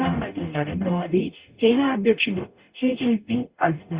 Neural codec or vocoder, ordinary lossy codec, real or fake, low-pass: codec, 44.1 kHz, 0.9 kbps, DAC; Opus, 64 kbps; fake; 3.6 kHz